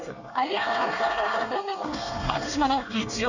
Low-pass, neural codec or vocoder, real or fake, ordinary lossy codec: 7.2 kHz; codec, 24 kHz, 1 kbps, SNAC; fake; none